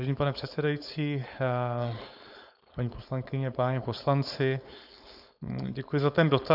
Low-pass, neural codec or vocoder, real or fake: 5.4 kHz; codec, 16 kHz, 4.8 kbps, FACodec; fake